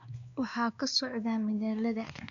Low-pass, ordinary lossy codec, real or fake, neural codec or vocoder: 7.2 kHz; none; fake; codec, 16 kHz, 2 kbps, X-Codec, WavLM features, trained on Multilingual LibriSpeech